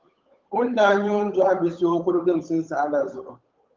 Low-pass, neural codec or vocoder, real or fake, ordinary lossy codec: 7.2 kHz; codec, 16 kHz, 8 kbps, FunCodec, trained on Chinese and English, 25 frames a second; fake; Opus, 24 kbps